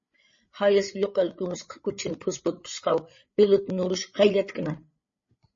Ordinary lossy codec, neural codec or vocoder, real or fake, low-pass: MP3, 32 kbps; codec, 16 kHz, 8 kbps, FreqCodec, larger model; fake; 7.2 kHz